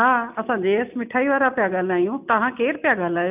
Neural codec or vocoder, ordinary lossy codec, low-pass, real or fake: none; none; 3.6 kHz; real